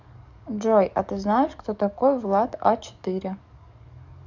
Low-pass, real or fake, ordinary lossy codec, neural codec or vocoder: 7.2 kHz; fake; none; codec, 44.1 kHz, 7.8 kbps, DAC